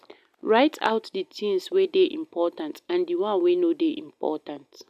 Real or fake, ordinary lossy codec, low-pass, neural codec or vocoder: real; none; 14.4 kHz; none